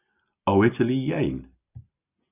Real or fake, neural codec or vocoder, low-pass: real; none; 3.6 kHz